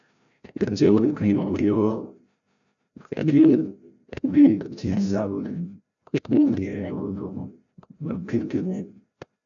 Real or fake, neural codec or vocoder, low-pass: fake; codec, 16 kHz, 0.5 kbps, FreqCodec, larger model; 7.2 kHz